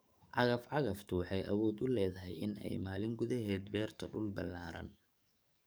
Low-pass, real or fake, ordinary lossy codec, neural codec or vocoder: none; fake; none; codec, 44.1 kHz, 7.8 kbps, DAC